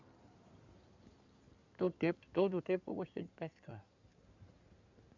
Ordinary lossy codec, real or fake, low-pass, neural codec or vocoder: MP3, 64 kbps; fake; 7.2 kHz; codec, 16 kHz in and 24 kHz out, 2.2 kbps, FireRedTTS-2 codec